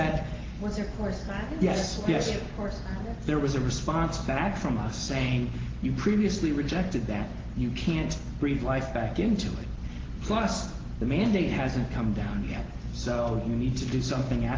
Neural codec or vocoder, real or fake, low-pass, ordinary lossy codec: none; real; 7.2 kHz; Opus, 16 kbps